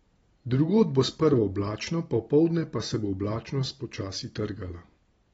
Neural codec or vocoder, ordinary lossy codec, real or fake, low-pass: none; AAC, 24 kbps; real; 19.8 kHz